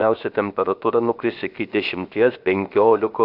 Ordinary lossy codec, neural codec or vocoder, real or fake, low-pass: AAC, 48 kbps; codec, 16 kHz, 0.7 kbps, FocalCodec; fake; 5.4 kHz